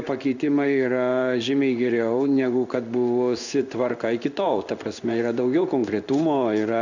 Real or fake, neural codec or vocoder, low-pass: fake; codec, 16 kHz in and 24 kHz out, 1 kbps, XY-Tokenizer; 7.2 kHz